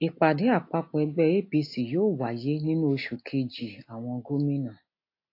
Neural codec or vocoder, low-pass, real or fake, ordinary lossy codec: none; 5.4 kHz; real; AAC, 32 kbps